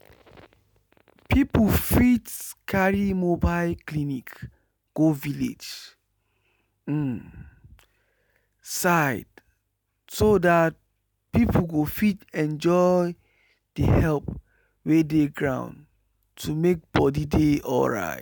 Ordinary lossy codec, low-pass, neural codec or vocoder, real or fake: none; none; none; real